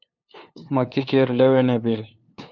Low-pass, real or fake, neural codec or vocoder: 7.2 kHz; fake; codec, 16 kHz, 2 kbps, FunCodec, trained on LibriTTS, 25 frames a second